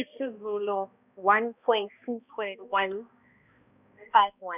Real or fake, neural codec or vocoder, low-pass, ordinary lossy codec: fake; codec, 16 kHz, 1 kbps, X-Codec, HuBERT features, trained on balanced general audio; 3.6 kHz; none